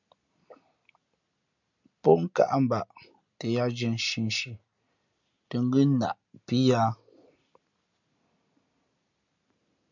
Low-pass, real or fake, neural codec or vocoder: 7.2 kHz; real; none